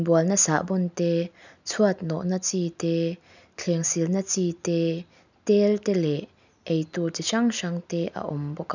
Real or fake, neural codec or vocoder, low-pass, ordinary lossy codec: real; none; 7.2 kHz; none